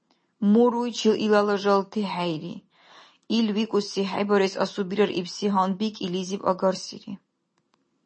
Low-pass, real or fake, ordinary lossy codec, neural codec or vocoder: 9.9 kHz; real; MP3, 32 kbps; none